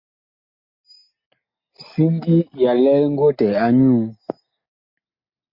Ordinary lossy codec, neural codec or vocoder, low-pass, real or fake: MP3, 32 kbps; none; 5.4 kHz; real